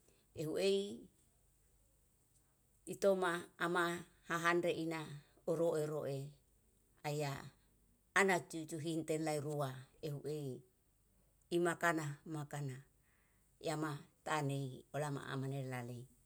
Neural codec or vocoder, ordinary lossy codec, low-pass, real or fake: none; none; none; real